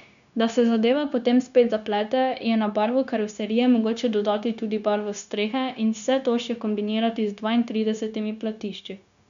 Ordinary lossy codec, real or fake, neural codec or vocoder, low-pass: none; fake; codec, 16 kHz, 0.9 kbps, LongCat-Audio-Codec; 7.2 kHz